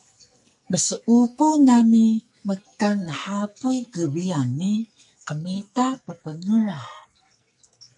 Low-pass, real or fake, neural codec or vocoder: 10.8 kHz; fake; codec, 44.1 kHz, 2.6 kbps, SNAC